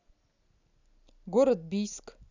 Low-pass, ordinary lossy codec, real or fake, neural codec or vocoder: 7.2 kHz; none; real; none